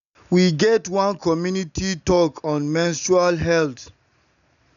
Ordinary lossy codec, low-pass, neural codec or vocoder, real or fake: none; 7.2 kHz; none; real